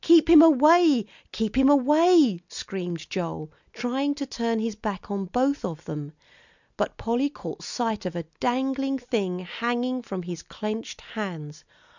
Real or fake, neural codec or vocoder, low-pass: real; none; 7.2 kHz